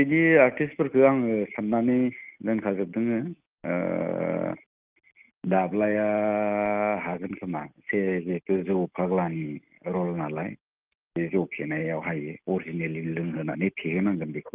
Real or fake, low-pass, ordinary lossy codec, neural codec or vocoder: real; 3.6 kHz; Opus, 32 kbps; none